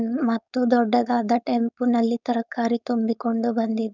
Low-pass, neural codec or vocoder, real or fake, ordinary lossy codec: 7.2 kHz; codec, 16 kHz, 4.8 kbps, FACodec; fake; none